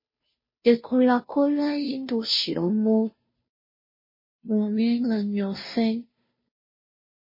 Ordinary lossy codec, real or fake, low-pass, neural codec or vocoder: MP3, 24 kbps; fake; 5.4 kHz; codec, 16 kHz, 0.5 kbps, FunCodec, trained on Chinese and English, 25 frames a second